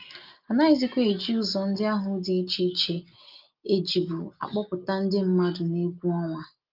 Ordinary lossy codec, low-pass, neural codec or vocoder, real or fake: Opus, 24 kbps; 5.4 kHz; none; real